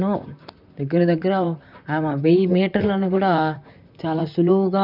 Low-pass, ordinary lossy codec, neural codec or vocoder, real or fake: 5.4 kHz; none; vocoder, 44.1 kHz, 128 mel bands, Pupu-Vocoder; fake